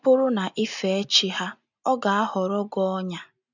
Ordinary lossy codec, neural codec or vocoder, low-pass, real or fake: none; none; 7.2 kHz; real